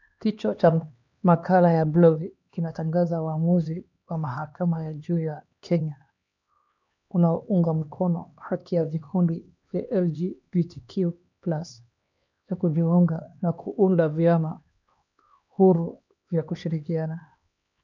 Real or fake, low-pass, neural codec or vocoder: fake; 7.2 kHz; codec, 16 kHz, 2 kbps, X-Codec, HuBERT features, trained on LibriSpeech